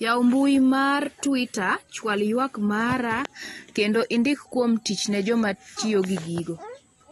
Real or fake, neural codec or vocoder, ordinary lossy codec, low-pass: real; none; AAC, 32 kbps; 19.8 kHz